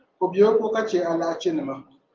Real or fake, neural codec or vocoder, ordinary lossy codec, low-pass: real; none; Opus, 32 kbps; 7.2 kHz